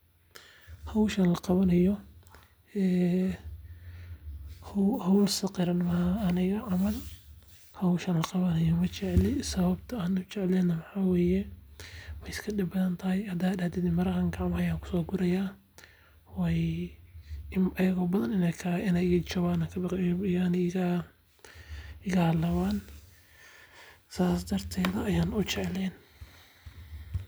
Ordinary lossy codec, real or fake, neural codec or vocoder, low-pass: none; real; none; none